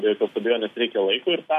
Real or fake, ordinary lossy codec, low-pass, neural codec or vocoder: real; MP3, 64 kbps; 14.4 kHz; none